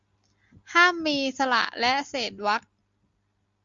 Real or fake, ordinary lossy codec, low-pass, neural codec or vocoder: real; Opus, 64 kbps; 7.2 kHz; none